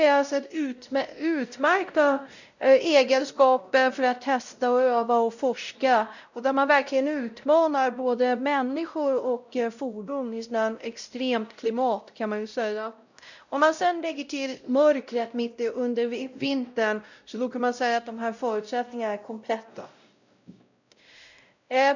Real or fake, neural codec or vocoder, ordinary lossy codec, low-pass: fake; codec, 16 kHz, 0.5 kbps, X-Codec, WavLM features, trained on Multilingual LibriSpeech; none; 7.2 kHz